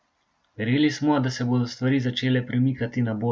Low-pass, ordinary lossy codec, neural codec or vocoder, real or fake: none; none; none; real